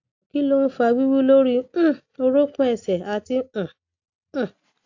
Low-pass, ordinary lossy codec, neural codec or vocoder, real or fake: 7.2 kHz; AAC, 48 kbps; none; real